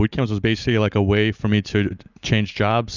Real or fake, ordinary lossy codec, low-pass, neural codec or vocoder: real; Opus, 64 kbps; 7.2 kHz; none